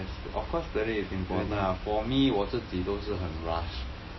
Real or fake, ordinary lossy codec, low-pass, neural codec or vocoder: real; MP3, 24 kbps; 7.2 kHz; none